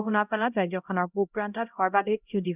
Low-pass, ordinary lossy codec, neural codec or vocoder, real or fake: 3.6 kHz; none; codec, 16 kHz, 0.5 kbps, X-Codec, HuBERT features, trained on LibriSpeech; fake